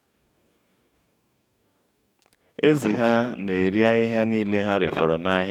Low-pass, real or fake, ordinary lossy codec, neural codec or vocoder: 19.8 kHz; fake; none; codec, 44.1 kHz, 2.6 kbps, DAC